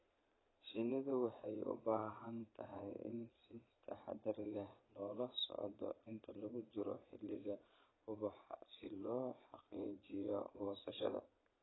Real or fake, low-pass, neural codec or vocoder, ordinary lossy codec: fake; 7.2 kHz; vocoder, 22.05 kHz, 80 mel bands, Vocos; AAC, 16 kbps